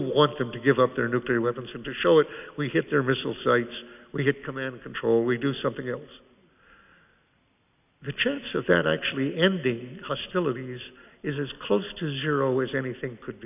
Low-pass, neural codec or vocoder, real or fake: 3.6 kHz; none; real